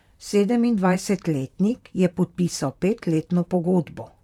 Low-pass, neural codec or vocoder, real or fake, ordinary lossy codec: 19.8 kHz; vocoder, 44.1 kHz, 128 mel bands, Pupu-Vocoder; fake; none